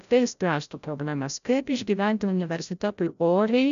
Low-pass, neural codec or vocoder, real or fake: 7.2 kHz; codec, 16 kHz, 0.5 kbps, FreqCodec, larger model; fake